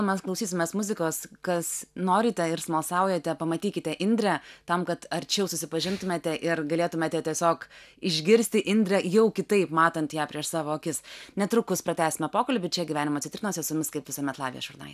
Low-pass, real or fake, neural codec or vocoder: 14.4 kHz; real; none